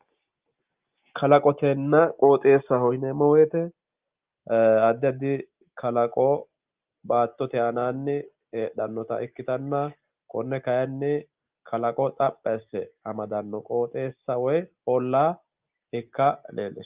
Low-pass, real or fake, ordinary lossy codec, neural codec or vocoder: 3.6 kHz; real; Opus, 32 kbps; none